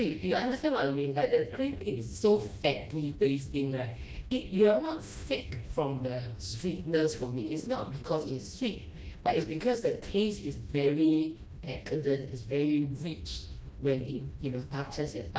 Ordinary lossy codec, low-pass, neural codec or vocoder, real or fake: none; none; codec, 16 kHz, 1 kbps, FreqCodec, smaller model; fake